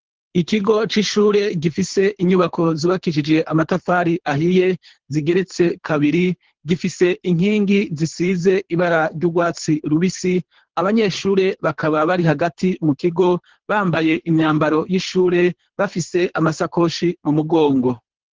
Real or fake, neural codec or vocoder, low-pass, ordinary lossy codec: fake; codec, 24 kHz, 3 kbps, HILCodec; 7.2 kHz; Opus, 16 kbps